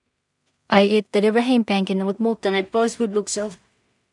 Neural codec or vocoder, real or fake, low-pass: codec, 16 kHz in and 24 kHz out, 0.4 kbps, LongCat-Audio-Codec, two codebook decoder; fake; 10.8 kHz